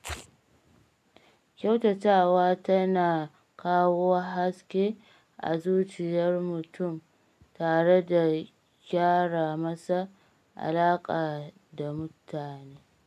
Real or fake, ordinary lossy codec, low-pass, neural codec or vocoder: real; none; 14.4 kHz; none